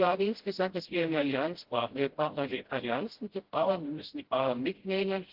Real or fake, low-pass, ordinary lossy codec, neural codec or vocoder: fake; 5.4 kHz; Opus, 16 kbps; codec, 16 kHz, 0.5 kbps, FreqCodec, smaller model